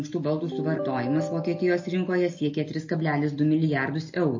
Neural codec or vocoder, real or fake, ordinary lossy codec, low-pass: none; real; MP3, 32 kbps; 7.2 kHz